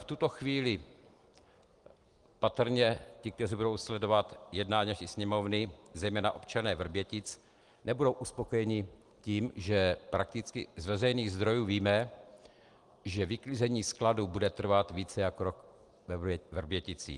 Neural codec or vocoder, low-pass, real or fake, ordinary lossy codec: none; 10.8 kHz; real; Opus, 24 kbps